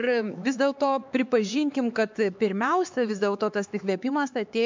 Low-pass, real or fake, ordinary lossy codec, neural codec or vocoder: 7.2 kHz; fake; MP3, 64 kbps; codec, 16 kHz, 4 kbps, X-Codec, HuBERT features, trained on LibriSpeech